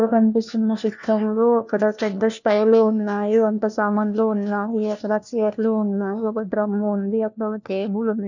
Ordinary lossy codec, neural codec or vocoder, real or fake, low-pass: none; codec, 16 kHz, 1 kbps, FunCodec, trained on LibriTTS, 50 frames a second; fake; 7.2 kHz